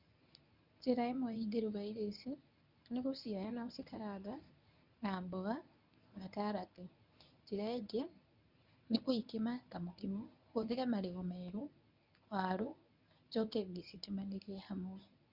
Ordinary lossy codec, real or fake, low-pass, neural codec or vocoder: none; fake; 5.4 kHz; codec, 24 kHz, 0.9 kbps, WavTokenizer, medium speech release version 1